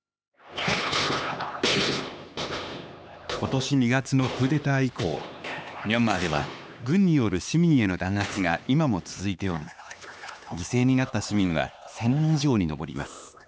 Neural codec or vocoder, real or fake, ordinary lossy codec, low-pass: codec, 16 kHz, 2 kbps, X-Codec, HuBERT features, trained on LibriSpeech; fake; none; none